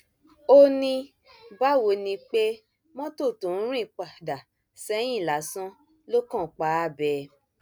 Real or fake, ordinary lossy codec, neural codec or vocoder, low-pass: real; none; none; none